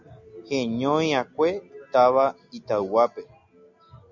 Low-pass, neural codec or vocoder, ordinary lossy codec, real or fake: 7.2 kHz; none; MP3, 64 kbps; real